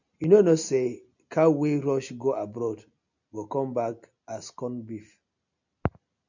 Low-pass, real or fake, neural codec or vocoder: 7.2 kHz; real; none